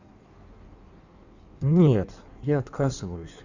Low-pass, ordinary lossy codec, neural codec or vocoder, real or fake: 7.2 kHz; none; codec, 16 kHz in and 24 kHz out, 1.1 kbps, FireRedTTS-2 codec; fake